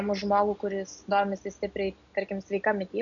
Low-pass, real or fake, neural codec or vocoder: 7.2 kHz; real; none